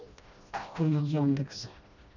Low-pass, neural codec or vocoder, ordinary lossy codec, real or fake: 7.2 kHz; codec, 16 kHz, 1 kbps, FreqCodec, smaller model; none; fake